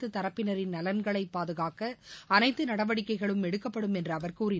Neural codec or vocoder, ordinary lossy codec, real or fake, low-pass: none; none; real; none